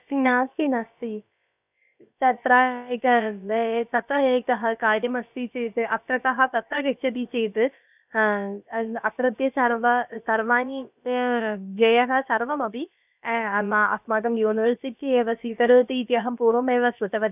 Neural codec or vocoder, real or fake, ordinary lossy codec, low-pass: codec, 16 kHz, about 1 kbps, DyCAST, with the encoder's durations; fake; none; 3.6 kHz